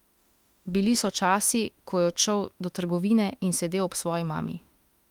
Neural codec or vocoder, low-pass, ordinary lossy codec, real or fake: autoencoder, 48 kHz, 32 numbers a frame, DAC-VAE, trained on Japanese speech; 19.8 kHz; Opus, 32 kbps; fake